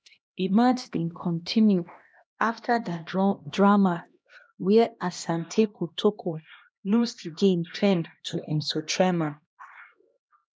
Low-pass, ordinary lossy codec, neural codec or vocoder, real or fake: none; none; codec, 16 kHz, 1 kbps, X-Codec, HuBERT features, trained on LibriSpeech; fake